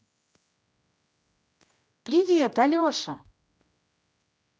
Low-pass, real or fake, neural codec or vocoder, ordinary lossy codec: none; fake; codec, 16 kHz, 1 kbps, X-Codec, HuBERT features, trained on general audio; none